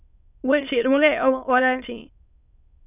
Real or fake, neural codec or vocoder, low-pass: fake; autoencoder, 22.05 kHz, a latent of 192 numbers a frame, VITS, trained on many speakers; 3.6 kHz